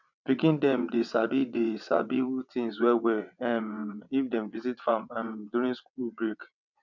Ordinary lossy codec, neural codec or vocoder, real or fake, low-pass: none; vocoder, 22.05 kHz, 80 mel bands, WaveNeXt; fake; 7.2 kHz